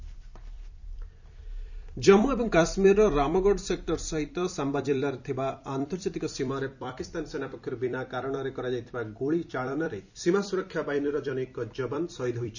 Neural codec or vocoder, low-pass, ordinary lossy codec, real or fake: vocoder, 44.1 kHz, 128 mel bands every 256 samples, BigVGAN v2; 7.2 kHz; none; fake